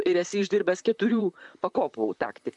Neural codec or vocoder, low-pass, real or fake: vocoder, 44.1 kHz, 128 mel bands, Pupu-Vocoder; 10.8 kHz; fake